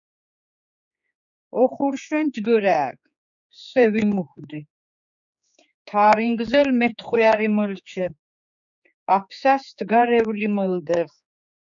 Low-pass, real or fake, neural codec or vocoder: 7.2 kHz; fake; codec, 16 kHz, 4 kbps, X-Codec, HuBERT features, trained on general audio